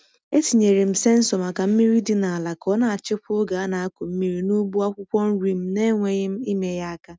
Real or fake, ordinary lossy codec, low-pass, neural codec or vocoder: real; none; none; none